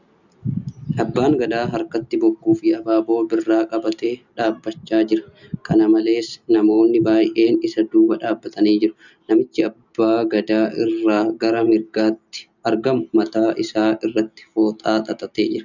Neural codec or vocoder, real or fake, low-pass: none; real; 7.2 kHz